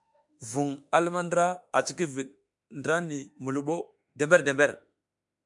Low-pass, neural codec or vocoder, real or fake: 10.8 kHz; autoencoder, 48 kHz, 32 numbers a frame, DAC-VAE, trained on Japanese speech; fake